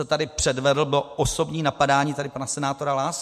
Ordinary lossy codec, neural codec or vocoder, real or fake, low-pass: MP3, 64 kbps; none; real; 14.4 kHz